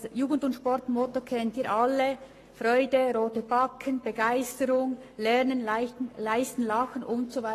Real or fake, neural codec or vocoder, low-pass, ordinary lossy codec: fake; codec, 44.1 kHz, 7.8 kbps, Pupu-Codec; 14.4 kHz; AAC, 48 kbps